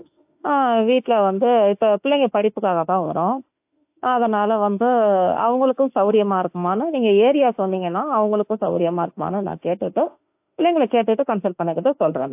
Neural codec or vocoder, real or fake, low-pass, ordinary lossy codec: autoencoder, 48 kHz, 32 numbers a frame, DAC-VAE, trained on Japanese speech; fake; 3.6 kHz; AAC, 32 kbps